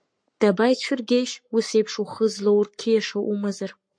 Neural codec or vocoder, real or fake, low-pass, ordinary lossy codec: codec, 44.1 kHz, 7.8 kbps, Pupu-Codec; fake; 9.9 kHz; MP3, 48 kbps